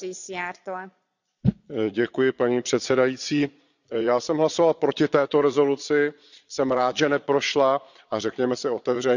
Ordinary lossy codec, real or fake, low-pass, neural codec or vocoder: none; fake; 7.2 kHz; vocoder, 22.05 kHz, 80 mel bands, Vocos